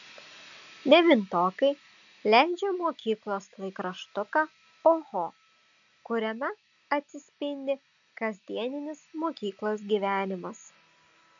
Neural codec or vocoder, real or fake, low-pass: none; real; 7.2 kHz